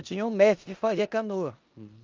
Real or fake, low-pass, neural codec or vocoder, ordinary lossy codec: fake; 7.2 kHz; codec, 16 kHz in and 24 kHz out, 0.9 kbps, LongCat-Audio-Codec, four codebook decoder; Opus, 24 kbps